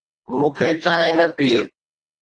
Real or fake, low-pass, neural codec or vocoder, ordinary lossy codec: fake; 9.9 kHz; codec, 24 kHz, 1.5 kbps, HILCodec; Opus, 64 kbps